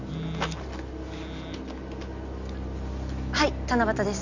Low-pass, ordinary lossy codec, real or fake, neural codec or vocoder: 7.2 kHz; none; real; none